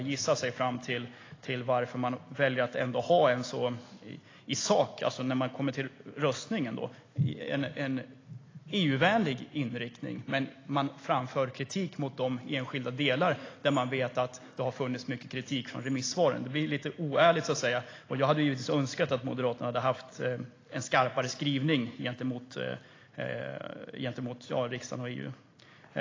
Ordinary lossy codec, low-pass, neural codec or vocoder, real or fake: AAC, 32 kbps; 7.2 kHz; none; real